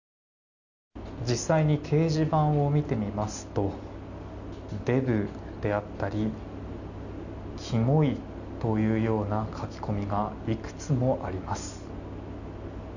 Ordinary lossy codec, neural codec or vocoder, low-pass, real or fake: none; none; 7.2 kHz; real